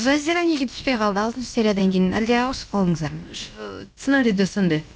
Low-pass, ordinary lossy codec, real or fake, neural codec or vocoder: none; none; fake; codec, 16 kHz, about 1 kbps, DyCAST, with the encoder's durations